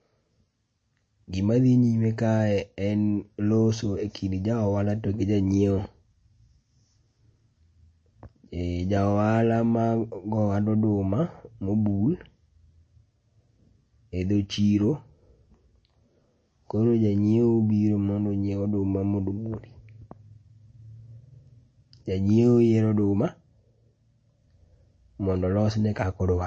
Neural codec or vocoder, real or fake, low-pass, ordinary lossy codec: none; real; 9.9 kHz; MP3, 32 kbps